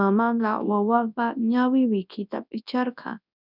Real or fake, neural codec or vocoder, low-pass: fake; codec, 24 kHz, 0.9 kbps, WavTokenizer, large speech release; 5.4 kHz